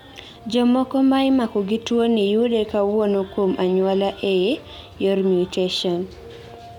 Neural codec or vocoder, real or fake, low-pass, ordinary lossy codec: none; real; 19.8 kHz; none